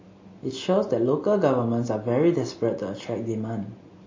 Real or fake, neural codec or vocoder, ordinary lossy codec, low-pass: real; none; MP3, 32 kbps; 7.2 kHz